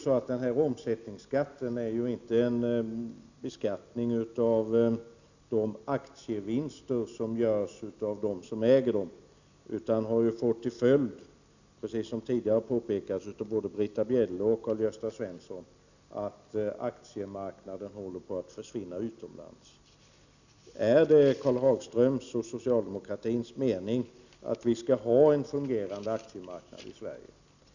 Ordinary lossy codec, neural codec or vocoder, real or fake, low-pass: none; none; real; 7.2 kHz